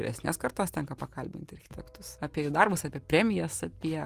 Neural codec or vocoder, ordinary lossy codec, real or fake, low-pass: none; Opus, 32 kbps; real; 14.4 kHz